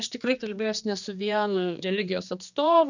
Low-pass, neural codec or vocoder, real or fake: 7.2 kHz; codec, 16 kHz, 2 kbps, X-Codec, HuBERT features, trained on general audio; fake